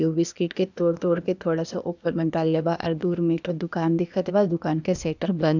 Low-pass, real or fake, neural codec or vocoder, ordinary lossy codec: 7.2 kHz; fake; codec, 16 kHz, 0.8 kbps, ZipCodec; none